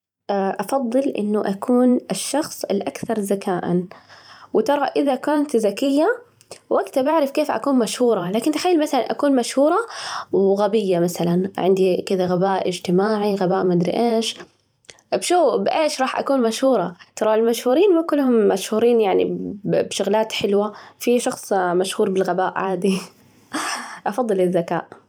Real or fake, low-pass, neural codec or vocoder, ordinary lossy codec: fake; 19.8 kHz; vocoder, 44.1 kHz, 128 mel bands every 512 samples, BigVGAN v2; none